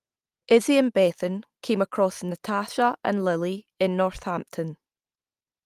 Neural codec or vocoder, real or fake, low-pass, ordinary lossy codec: none; real; 14.4 kHz; Opus, 32 kbps